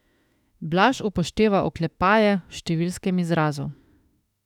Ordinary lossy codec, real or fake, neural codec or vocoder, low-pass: none; fake; autoencoder, 48 kHz, 32 numbers a frame, DAC-VAE, trained on Japanese speech; 19.8 kHz